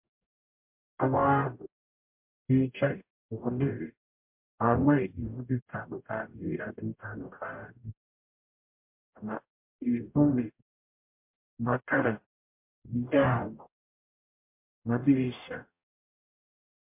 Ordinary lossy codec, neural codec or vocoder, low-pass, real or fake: MP3, 32 kbps; codec, 44.1 kHz, 0.9 kbps, DAC; 3.6 kHz; fake